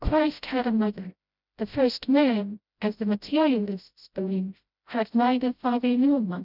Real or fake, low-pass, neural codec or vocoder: fake; 5.4 kHz; codec, 16 kHz, 0.5 kbps, FreqCodec, smaller model